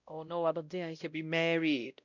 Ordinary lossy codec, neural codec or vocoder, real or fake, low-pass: none; codec, 16 kHz, 0.5 kbps, X-Codec, WavLM features, trained on Multilingual LibriSpeech; fake; 7.2 kHz